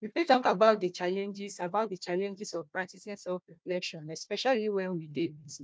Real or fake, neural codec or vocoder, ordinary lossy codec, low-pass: fake; codec, 16 kHz, 1 kbps, FunCodec, trained on Chinese and English, 50 frames a second; none; none